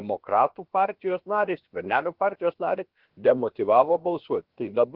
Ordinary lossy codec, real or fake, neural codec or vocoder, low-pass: Opus, 16 kbps; fake; codec, 16 kHz, about 1 kbps, DyCAST, with the encoder's durations; 5.4 kHz